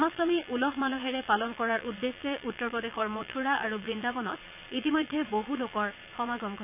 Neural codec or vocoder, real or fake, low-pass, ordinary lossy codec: vocoder, 22.05 kHz, 80 mel bands, Vocos; fake; 3.6 kHz; none